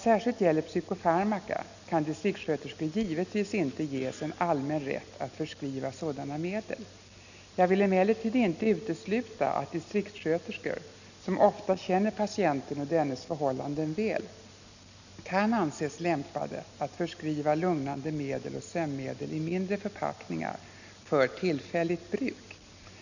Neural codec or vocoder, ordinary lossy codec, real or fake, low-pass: none; none; real; 7.2 kHz